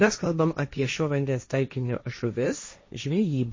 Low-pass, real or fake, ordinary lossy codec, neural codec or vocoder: 7.2 kHz; fake; MP3, 32 kbps; codec, 16 kHz, 1.1 kbps, Voila-Tokenizer